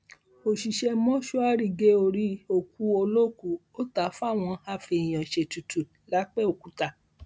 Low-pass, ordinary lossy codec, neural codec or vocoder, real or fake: none; none; none; real